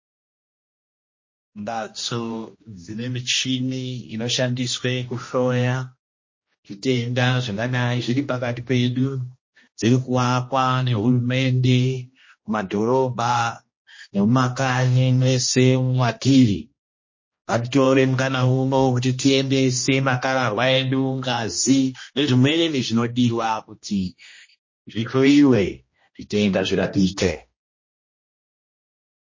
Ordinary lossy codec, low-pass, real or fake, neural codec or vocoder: MP3, 32 kbps; 7.2 kHz; fake; codec, 16 kHz, 1 kbps, X-Codec, HuBERT features, trained on general audio